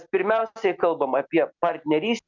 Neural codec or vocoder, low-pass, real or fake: none; 7.2 kHz; real